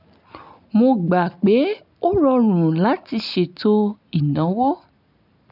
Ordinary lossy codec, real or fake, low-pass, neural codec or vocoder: none; real; 5.4 kHz; none